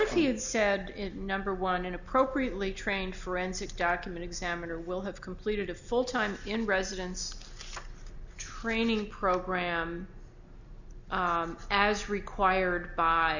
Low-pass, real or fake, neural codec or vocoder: 7.2 kHz; real; none